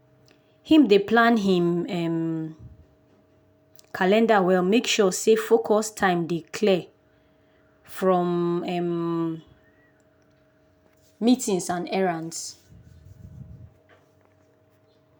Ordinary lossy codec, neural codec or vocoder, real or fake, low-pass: none; none; real; none